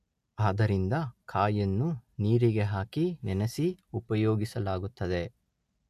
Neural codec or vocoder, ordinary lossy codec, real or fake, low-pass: none; MP3, 64 kbps; real; 14.4 kHz